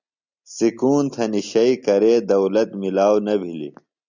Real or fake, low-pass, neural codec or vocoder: real; 7.2 kHz; none